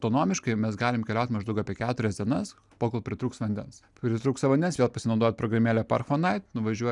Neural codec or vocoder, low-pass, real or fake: none; 10.8 kHz; real